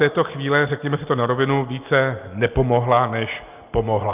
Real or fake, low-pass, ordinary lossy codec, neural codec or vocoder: real; 3.6 kHz; Opus, 24 kbps; none